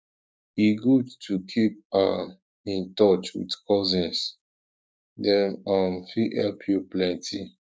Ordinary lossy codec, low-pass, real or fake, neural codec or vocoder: none; none; fake; codec, 16 kHz, 6 kbps, DAC